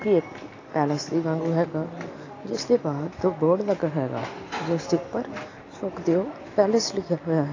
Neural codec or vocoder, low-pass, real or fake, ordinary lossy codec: none; 7.2 kHz; real; AAC, 32 kbps